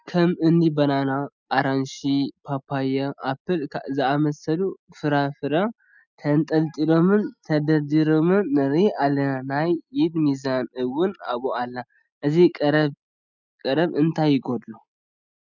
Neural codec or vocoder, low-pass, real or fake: none; 7.2 kHz; real